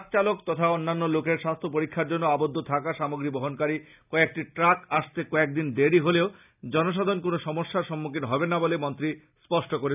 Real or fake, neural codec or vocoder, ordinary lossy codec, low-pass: real; none; none; 3.6 kHz